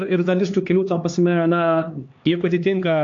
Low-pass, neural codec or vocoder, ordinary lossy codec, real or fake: 7.2 kHz; codec, 16 kHz, 2 kbps, X-Codec, HuBERT features, trained on LibriSpeech; AAC, 48 kbps; fake